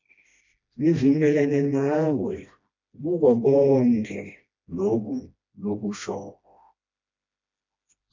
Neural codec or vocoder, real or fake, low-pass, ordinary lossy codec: codec, 16 kHz, 1 kbps, FreqCodec, smaller model; fake; 7.2 kHz; MP3, 64 kbps